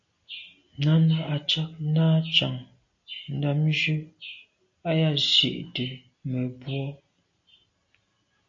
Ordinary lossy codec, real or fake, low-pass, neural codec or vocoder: AAC, 48 kbps; real; 7.2 kHz; none